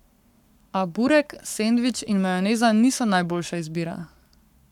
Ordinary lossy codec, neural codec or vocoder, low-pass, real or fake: none; codec, 44.1 kHz, 7.8 kbps, Pupu-Codec; 19.8 kHz; fake